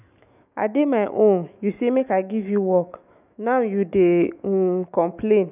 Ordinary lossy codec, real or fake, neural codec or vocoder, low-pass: none; fake; autoencoder, 48 kHz, 128 numbers a frame, DAC-VAE, trained on Japanese speech; 3.6 kHz